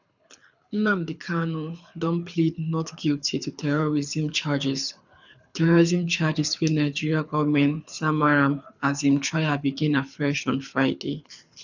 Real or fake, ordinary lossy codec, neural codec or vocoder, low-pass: fake; none; codec, 24 kHz, 6 kbps, HILCodec; 7.2 kHz